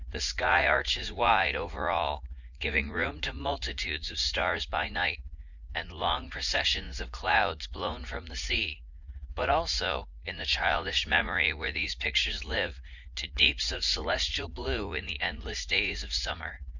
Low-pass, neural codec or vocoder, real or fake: 7.2 kHz; vocoder, 44.1 kHz, 80 mel bands, Vocos; fake